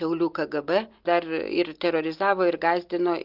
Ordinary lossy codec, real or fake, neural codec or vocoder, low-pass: Opus, 32 kbps; real; none; 5.4 kHz